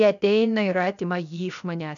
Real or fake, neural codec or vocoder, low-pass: fake; codec, 16 kHz, 0.7 kbps, FocalCodec; 7.2 kHz